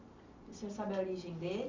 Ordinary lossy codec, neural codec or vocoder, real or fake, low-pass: MP3, 64 kbps; none; real; 7.2 kHz